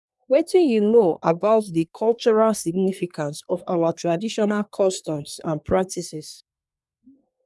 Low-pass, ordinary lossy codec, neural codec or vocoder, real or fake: none; none; codec, 24 kHz, 1 kbps, SNAC; fake